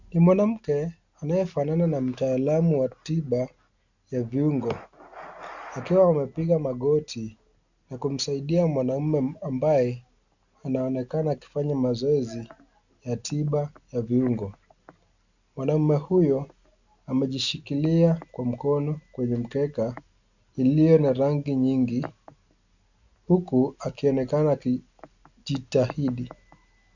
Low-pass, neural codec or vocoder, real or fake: 7.2 kHz; none; real